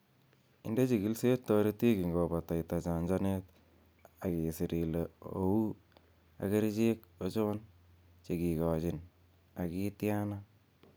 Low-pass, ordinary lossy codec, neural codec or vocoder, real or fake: none; none; none; real